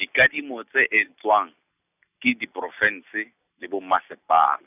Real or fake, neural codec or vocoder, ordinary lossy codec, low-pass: real; none; none; 3.6 kHz